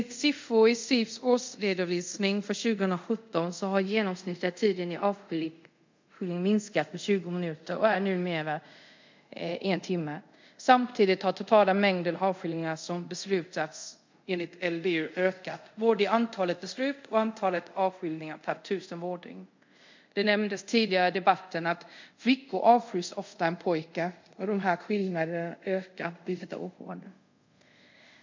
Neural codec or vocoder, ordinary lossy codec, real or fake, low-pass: codec, 24 kHz, 0.5 kbps, DualCodec; MP3, 64 kbps; fake; 7.2 kHz